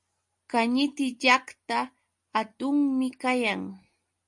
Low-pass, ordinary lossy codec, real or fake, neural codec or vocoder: 10.8 kHz; MP3, 48 kbps; real; none